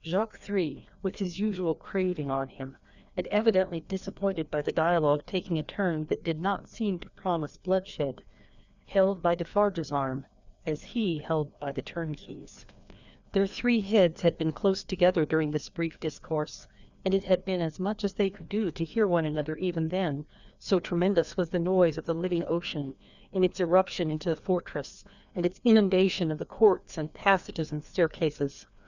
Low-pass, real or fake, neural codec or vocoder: 7.2 kHz; fake; codec, 16 kHz, 2 kbps, FreqCodec, larger model